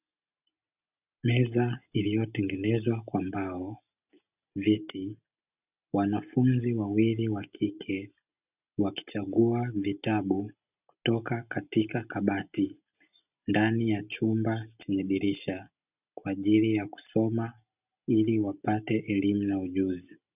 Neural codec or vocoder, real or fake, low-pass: none; real; 3.6 kHz